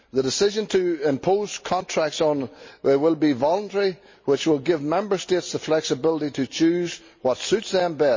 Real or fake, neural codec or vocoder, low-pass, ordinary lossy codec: real; none; 7.2 kHz; MP3, 32 kbps